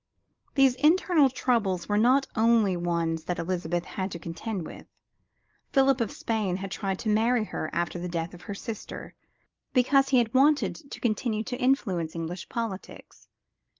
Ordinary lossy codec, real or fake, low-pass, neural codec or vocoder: Opus, 32 kbps; real; 7.2 kHz; none